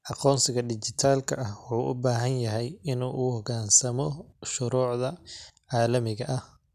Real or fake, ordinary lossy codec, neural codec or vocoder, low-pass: real; none; none; 14.4 kHz